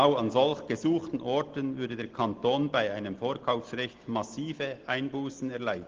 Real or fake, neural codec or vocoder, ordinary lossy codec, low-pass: real; none; Opus, 16 kbps; 7.2 kHz